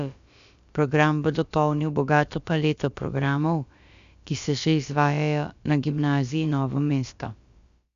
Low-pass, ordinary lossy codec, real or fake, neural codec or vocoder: 7.2 kHz; Opus, 64 kbps; fake; codec, 16 kHz, about 1 kbps, DyCAST, with the encoder's durations